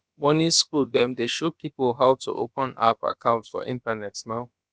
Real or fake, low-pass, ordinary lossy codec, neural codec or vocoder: fake; none; none; codec, 16 kHz, about 1 kbps, DyCAST, with the encoder's durations